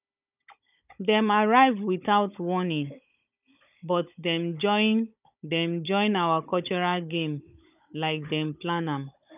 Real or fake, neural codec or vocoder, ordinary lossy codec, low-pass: fake; codec, 16 kHz, 16 kbps, FunCodec, trained on Chinese and English, 50 frames a second; none; 3.6 kHz